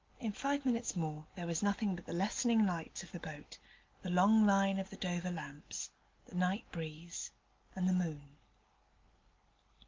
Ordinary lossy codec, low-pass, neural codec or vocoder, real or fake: Opus, 32 kbps; 7.2 kHz; none; real